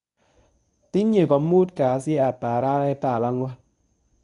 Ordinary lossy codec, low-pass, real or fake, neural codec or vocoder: MP3, 96 kbps; 10.8 kHz; fake; codec, 24 kHz, 0.9 kbps, WavTokenizer, medium speech release version 1